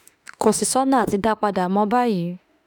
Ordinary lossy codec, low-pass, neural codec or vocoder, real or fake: none; none; autoencoder, 48 kHz, 32 numbers a frame, DAC-VAE, trained on Japanese speech; fake